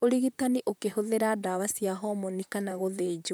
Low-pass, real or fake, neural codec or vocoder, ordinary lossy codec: none; fake; vocoder, 44.1 kHz, 128 mel bands, Pupu-Vocoder; none